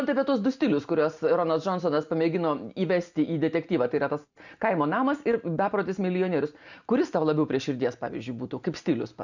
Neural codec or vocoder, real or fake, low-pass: none; real; 7.2 kHz